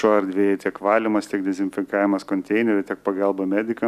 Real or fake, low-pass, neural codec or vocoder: real; 14.4 kHz; none